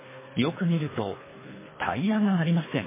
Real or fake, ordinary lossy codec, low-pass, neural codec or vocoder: fake; MP3, 16 kbps; 3.6 kHz; codec, 24 kHz, 3 kbps, HILCodec